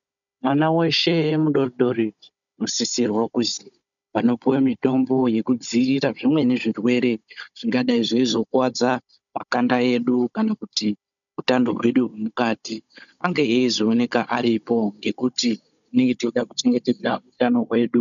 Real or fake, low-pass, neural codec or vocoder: fake; 7.2 kHz; codec, 16 kHz, 16 kbps, FunCodec, trained on Chinese and English, 50 frames a second